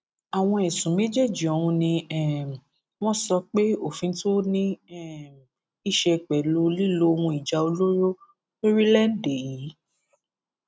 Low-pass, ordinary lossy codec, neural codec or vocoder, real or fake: none; none; none; real